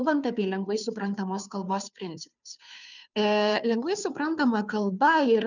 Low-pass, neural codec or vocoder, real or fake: 7.2 kHz; codec, 16 kHz, 2 kbps, FunCodec, trained on Chinese and English, 25 frames a second; fake